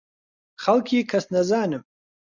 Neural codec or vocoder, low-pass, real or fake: none; 7.2 kHz; real